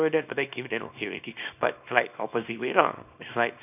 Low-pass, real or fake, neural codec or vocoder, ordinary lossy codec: 3.6 kHz; fake; codec, 24 kHz, 0.9 kbps, WavTokenizer, small release; none